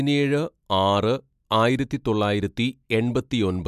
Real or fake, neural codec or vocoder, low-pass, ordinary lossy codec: real; none; 14.4 kHz; MP3, 96 kbps